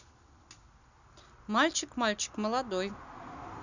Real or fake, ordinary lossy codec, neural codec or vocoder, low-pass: real; none; none; 7.2 kHz